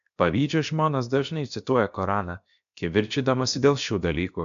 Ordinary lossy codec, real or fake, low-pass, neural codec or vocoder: MP3, 64 kbps; fake; 7.2 kHz; codec, 16 kHz, 0.7 kbps, FocalCodec